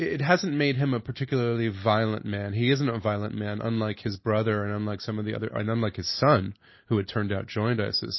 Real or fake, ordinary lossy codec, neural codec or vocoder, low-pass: real; MP3, 24 kbps; none; 7.2 kHz